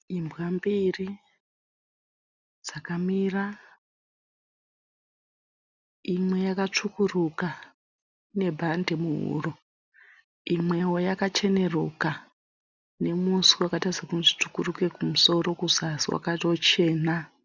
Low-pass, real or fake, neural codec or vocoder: 7.2 kHz; real; none